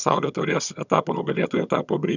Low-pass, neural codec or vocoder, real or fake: 7.2 kHz; vocoder, 22.05 kHz, 80 mel bands, HiFi-GAN; fake